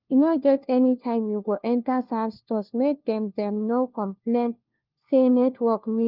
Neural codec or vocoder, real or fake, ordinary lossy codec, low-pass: codec, 16 kHz, 1 kbps, FunCodec, trained on LibriTTS, 50 frames a second; fake; Opus, 24 kbps; 5.4 kHz